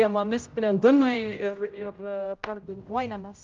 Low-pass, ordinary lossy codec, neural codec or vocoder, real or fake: 7.2 kHz; Opus, 32 kbps; codec, 16 kHz, 0.5 kbps, X-Codec, HuBERT features, trained on general audio; fake